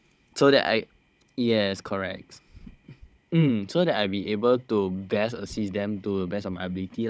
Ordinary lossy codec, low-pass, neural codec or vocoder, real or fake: none; none; codec, 16 kHz, 16 kbps, FunCodec, trained on Chinese and English, 50 frames a second; fake